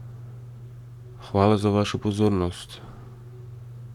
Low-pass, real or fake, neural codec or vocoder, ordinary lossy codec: 19.8 kHz; fake; codec, 44.1 kHz, 7.8 kbps, Pupu-Codec; none